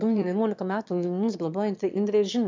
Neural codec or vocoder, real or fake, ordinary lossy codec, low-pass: autoencoder, 22.05 kHz, a latent of 192 numbers a frame, VITS, trained on one speaker; fake; MP3, 64 kbps; 7.2 kHz